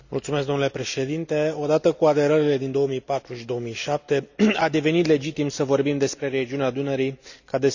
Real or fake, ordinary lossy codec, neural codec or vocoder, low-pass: real; none; none; 7.2 kHz